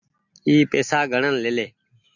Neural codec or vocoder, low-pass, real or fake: none; 7.2 kHz; real